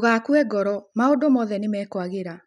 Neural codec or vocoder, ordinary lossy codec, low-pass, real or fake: none; none; 10.8 kHz; real